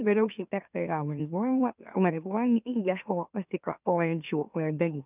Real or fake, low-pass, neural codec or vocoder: fake; 3.6 kHz; autoencoder, 44.1 kHz, a latent of 192 numbers a frame, MeloTTS